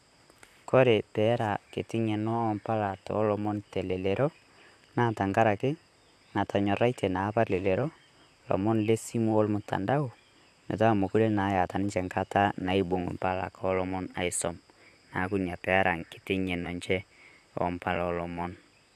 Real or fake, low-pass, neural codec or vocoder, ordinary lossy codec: fake; 14.4 kHz; vocoder, 44.1 kHz, 128 mel bands, Pupu-Vocoder; none